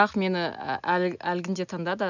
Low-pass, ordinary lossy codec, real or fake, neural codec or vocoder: 7.2 kHz; none; real; none